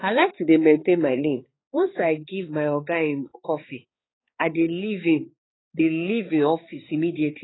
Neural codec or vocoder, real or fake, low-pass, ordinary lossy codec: codec, 16 kHz, 4 kbps, X-Codec, HuBERT features, trained on balanced general audio; fake; 7.2 kHz; AAC, 16 kbps